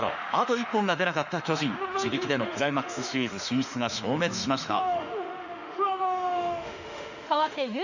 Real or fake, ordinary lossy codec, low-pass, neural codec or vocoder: fake; none; 7.2 kHz; autoencoder, 48 kHz, 32 numbers a frame, DAC-VAE, trained on Japanese speech